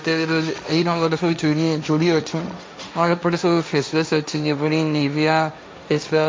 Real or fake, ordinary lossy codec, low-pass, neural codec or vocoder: fake; none; none; codec, 16 kHz, 1.1 kbps, Voila-Tokenizer